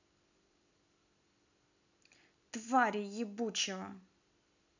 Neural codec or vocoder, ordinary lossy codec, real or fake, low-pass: none; none; real; 7.2 kHz